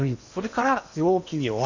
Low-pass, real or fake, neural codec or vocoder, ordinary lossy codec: 7.2 kHz; fake; codec, 16 kHz in and 24 kHz out, 0.8 kbps, FocalCodec, streaming, 65536 codes; none